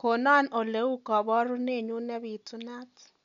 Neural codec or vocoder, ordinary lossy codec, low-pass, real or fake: none; none; 7.2 kHz; real